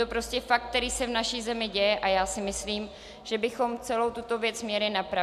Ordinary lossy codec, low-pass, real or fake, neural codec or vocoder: MP3, 96 kbps; 14.4 kHz; real; none